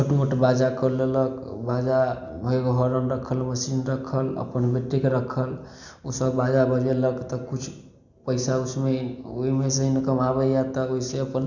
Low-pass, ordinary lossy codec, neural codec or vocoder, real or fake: 7.2 kHz; none; none; real